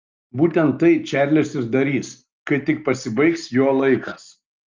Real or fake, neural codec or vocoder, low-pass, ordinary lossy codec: real; none; 7.2 kHz; Opus, 24 kbps